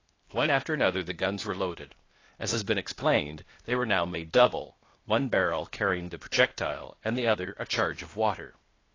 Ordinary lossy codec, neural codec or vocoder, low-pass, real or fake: AAC, 32 kbps; codec, 16 kHz, 0.8 kbps, ZipCodec; 7.2 kHz; fake